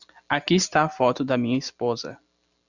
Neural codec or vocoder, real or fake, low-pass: none; real; 7.2 kHz